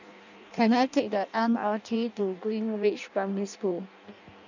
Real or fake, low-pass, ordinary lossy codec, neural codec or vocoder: fake; 7.2 kHz; none; codec, 16 kHz in and 24 kHz out, 0.6 kbps, FireRedTTS-2 codec